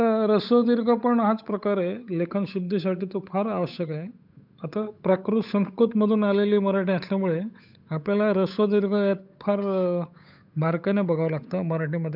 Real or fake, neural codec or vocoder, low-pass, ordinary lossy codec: fake; codec, 16 kHz, 8 kbps, FunCodec, trained on Chinese and English, 25 frames a second; 5.4 kHz; none